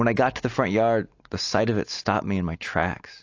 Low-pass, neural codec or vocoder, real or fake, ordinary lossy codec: 7.2 kHz; none; real; AAC, 48 kbps